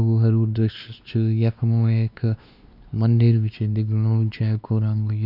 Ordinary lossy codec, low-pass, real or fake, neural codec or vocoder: none; 5.4 kHz; fake; codec, 16 kHz, 2 kbps, X-Codec, WavLM features, trained on Multilingual LibriSpeech